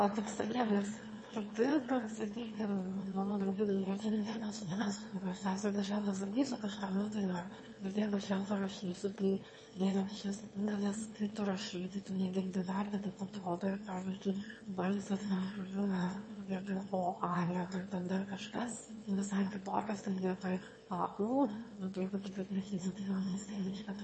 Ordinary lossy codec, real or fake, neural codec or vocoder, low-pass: MP3, 32 kbps; fake; autoencoder, 22.05 kHz, a latent of 192 numbers a frame, VITS, trained on one speaker; 9.9 kHz